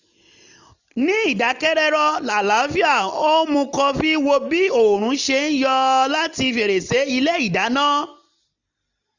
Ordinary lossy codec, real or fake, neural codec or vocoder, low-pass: none; real; none; 7.2 kHz